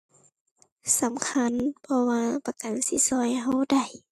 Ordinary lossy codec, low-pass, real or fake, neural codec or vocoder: AAC, 64 kbps; 14.4 kHz; real; none